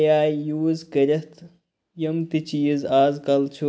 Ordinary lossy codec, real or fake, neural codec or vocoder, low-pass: none; real; none; none